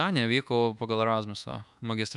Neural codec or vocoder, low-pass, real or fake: codec, 24 kHz, 1.2 kbps, DualCodec; 10.8 kHz; fake